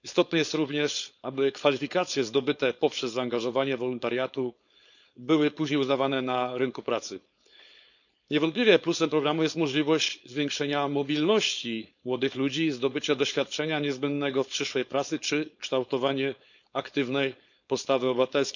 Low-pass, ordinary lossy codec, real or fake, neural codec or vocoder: 7.2 kHz; none; fake; codec, 16 kHz, 4.8 kbps, FACodec